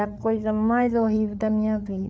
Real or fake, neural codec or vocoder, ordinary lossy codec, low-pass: fake; codec, 16 kHz, 8 kbps, FreqCodec, larger model; none; none